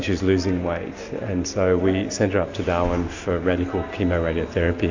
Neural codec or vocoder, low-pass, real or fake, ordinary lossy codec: none; 7.2 kHz; real; AAC, 48 kbps